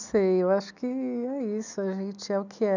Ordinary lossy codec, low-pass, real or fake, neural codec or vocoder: none; 7.2 kHz; real; none